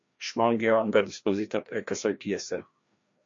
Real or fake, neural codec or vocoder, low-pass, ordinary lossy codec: fake; codec, 16 kHz, 1 kbps, FreqCodec, larger model; 7.2 kHz; MP3, 48 kbps